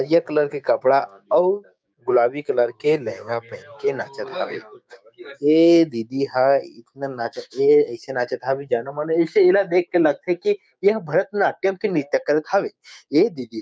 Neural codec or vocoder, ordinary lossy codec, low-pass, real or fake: codec, 16 kHz, 6 kbps, DAC; none; none; fake